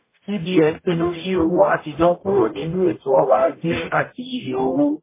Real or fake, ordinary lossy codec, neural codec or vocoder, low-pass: fake; MP3, 16 kbps; codec, 44.1 kHz, 0.9 kbps, DAC; 3.6 kHz